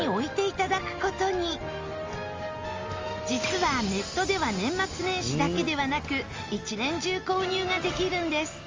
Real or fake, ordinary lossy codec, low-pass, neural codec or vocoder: real; Opus, 32 kbps; 7.2 kHz; none